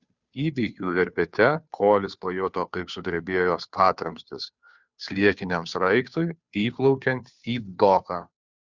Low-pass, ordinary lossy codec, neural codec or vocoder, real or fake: 7.2 kHz; Opus, 64 kbps; codec, 16 kHz, 2 kbps, FunCodec, trained on Chinese and English, 25 frames a second; fake